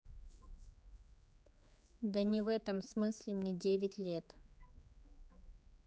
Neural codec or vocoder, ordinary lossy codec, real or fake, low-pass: codec, 16 kHz, 4 kbps, X-Codec, HuBERT features, trained on general audio; none; fake; none